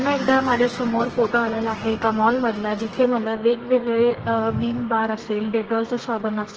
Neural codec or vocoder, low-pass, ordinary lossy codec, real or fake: codec, 32 kHz, 1.9 kbps, SNAC; 7.2 kHz; Opus, 16 kbps; fake